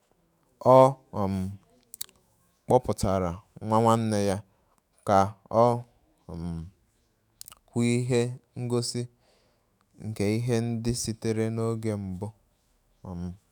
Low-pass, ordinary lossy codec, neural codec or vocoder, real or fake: none; none; autoencoder, 48 kHz, 128 numbers a frame, DAC-VAE, trained on Japanese speech; fake